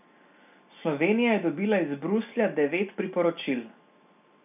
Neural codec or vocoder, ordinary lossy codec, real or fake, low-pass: none; none; real; 3.6 kHz